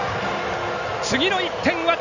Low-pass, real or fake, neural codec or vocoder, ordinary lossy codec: 7.2 kHz; real; none; none